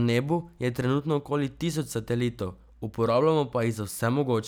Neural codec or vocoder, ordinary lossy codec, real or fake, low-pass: none; none; real; none